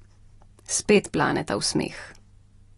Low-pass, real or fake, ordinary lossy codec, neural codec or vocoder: 10.8 kHz; real; AAC, 32 kbps; none